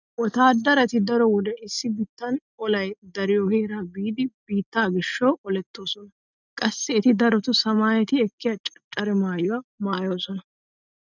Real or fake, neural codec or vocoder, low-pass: real; none; 7.2 kHz